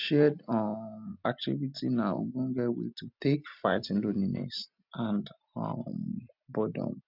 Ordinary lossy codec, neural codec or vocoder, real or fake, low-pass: none; none; real; 5.4 kHz